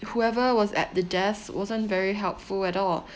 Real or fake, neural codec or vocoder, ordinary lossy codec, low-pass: real; none; none; none